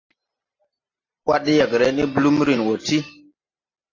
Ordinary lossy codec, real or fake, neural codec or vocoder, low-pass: AAC, 32 kbps; real; none; 7.2 kHz